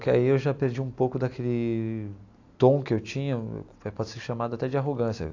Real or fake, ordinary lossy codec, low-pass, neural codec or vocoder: real; AAC, 48 kbps; 7.2 kHz; none